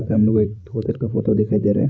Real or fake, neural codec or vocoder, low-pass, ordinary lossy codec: fake; codec, 16 kHz, 16 kbps, FreqCodec, larger model; none; none